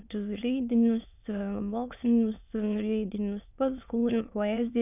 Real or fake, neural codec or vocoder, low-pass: fake; autoencoder, 22.05 kHz, a latent of 192 numbers a frame, VITS, trained on many speakers; 3.6 kHz